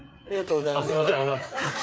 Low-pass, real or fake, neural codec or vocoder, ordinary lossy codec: none; fake; codec, 16 kHz, 4 kbps, FreqCodec, larger model; none